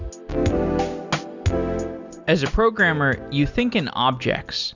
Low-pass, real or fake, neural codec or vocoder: 7.2 kHz; real; none